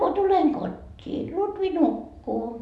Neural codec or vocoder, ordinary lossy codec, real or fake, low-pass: none; none; real; none